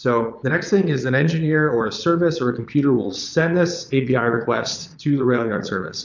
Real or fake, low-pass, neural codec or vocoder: fake; 7.2 kHz; vocoder, 22.05 kHz, 80 mel bands, Vocos